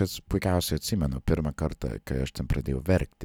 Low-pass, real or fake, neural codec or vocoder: 19.8 kHz; real; none